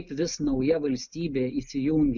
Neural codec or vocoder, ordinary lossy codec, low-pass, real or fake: none; MP3, 64 kbps; 7.2 kHz; real